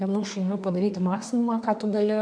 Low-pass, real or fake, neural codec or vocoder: 9.9 kHz; fake; codec, 24 kHz, 1 kbps, SNAC